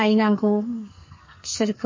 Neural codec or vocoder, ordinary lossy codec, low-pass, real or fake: codec, 44.1 kHz, 2.6 kbps, SNAC; MP3, 32 kbps; 7.2 kHz; fake